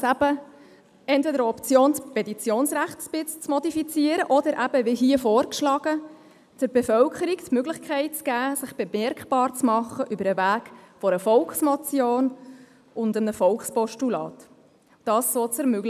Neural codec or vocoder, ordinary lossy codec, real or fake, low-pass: none; none; real; 14.4 kHz